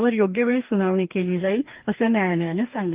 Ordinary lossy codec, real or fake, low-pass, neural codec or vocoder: Opus, 24 kbps; fake; 3.6 kHz; codec, 44.1 kHz, 2.6 kbps, DAC